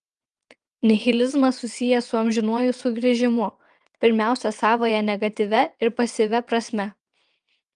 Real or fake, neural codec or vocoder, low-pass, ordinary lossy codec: fake; vocoder, 22.05 kHz, 80 mel bands, WaveNeXt; 9.9 kHz; Opus, 32 kbps